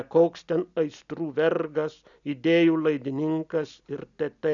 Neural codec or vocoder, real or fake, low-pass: none; real; 7.2 kHz